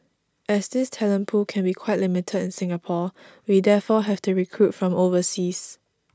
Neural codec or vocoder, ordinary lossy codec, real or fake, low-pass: none; none; real; none